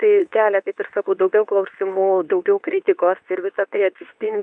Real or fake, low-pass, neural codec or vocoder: fake; 10.8 kHz; codec, 24 kHz, 0.9 kbps, WavTokenizer, medium speech release version 2